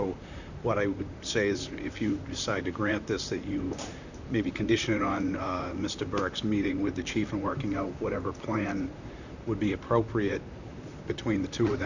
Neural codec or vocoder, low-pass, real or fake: vocoder, 44.1 kHz, 128 mel bands, Pupu-Vocoder; 7.2 kHz; fake